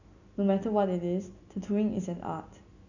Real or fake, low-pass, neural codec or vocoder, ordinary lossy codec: real; 7.2 kHz; none; none